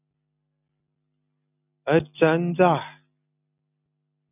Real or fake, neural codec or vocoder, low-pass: real; none; 3.6 kHz